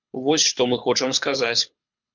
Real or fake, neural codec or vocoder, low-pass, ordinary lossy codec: fake; codec, 24 kHz, 6 kbps, HILCodec; 7.2 kHz; MP3, 64 kbps